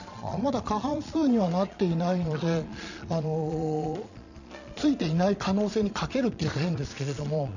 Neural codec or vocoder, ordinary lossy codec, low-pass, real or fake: vocoder, 22.05 kHz, 80 mel bands, Vocos; none; 7.2 kHz; fake